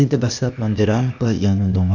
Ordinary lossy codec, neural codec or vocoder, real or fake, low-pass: none; codec, 16 kHz, 0.8 kbps, ZipCodec; fake; 7.2 kHz